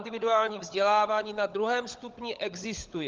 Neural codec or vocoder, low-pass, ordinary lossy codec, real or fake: codec, 16 kHz, 16 kbps, FunCodec, trained on LibriTTS, 50 frames a second; 7.2 kHz; Opus, 32 kbps; fake